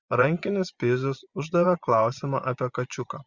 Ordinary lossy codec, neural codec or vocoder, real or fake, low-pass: Opus, 64 kbps; vocoder, 24 kHz, 100 mel bands, Vocos; fake; 7.2 kHz